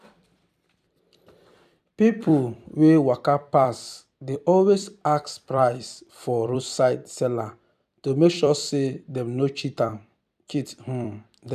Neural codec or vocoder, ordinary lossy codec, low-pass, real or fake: vocoder, 44.1 kHz, 128 mel bands every 256 samples, BigVGAN v2; none; 14.4 kHz; fake